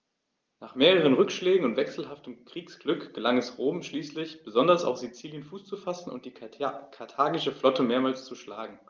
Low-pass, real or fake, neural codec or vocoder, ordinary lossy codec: 7.2 kHz; real; none; Opus, 24 kbps